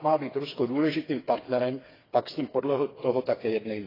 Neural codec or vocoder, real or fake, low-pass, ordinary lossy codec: codec, 16 kHz, 4 kbps, FreqCodec, smaller model; fake; 5.4 kHz; AAC, 24 kbps